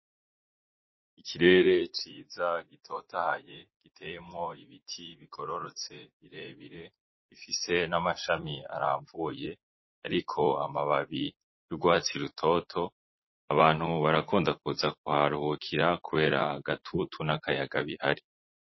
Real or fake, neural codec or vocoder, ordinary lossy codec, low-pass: fake; vocoder, 44.1 kHz, 80 mel bands, Vocos; MP3, 24 kbps; 7.2 kHz